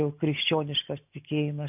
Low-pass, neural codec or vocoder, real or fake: 3.6 kHz; none; real